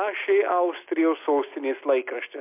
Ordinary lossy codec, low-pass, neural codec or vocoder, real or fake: MP3, 32 kbps; 3.6 kHz; none; real